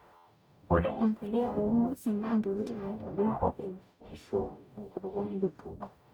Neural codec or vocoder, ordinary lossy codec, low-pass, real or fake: codec, 44.1 kHz, 0.9 kbps, DAC; none; 19.8 kHz; fake